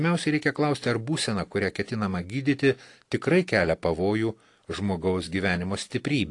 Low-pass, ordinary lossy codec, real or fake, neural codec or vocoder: 10.8 kHz; AAC, 48 kbps; real; none